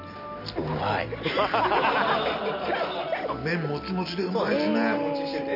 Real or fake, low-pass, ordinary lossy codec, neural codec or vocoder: real; 5.4 kHz; none; none